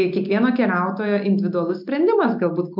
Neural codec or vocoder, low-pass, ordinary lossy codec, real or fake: none; 5.4 kHz; MP3, 48 kbps; real